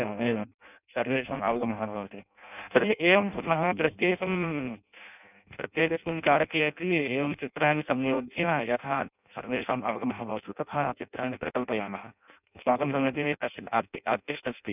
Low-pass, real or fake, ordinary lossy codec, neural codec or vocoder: 3.6 kHz; fake; none; codec, 16 kHz in and 24 kHz out, 0.6 kbps, FireRedTTS-2 codec